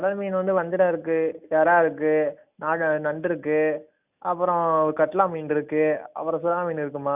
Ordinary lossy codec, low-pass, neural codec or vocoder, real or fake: none; 3.6 kHz; none; real